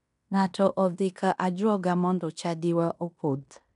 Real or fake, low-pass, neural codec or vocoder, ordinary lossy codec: fake; 10.8 kHz; codec, 16 kHz in and 24 kHz out, 0.9 kbps, LongCat-Audio-Codec, fine tuned four codebook decoder; none